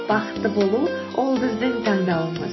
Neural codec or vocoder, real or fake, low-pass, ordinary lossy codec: none; real; 7.2 kHz; MP3, 24 kbps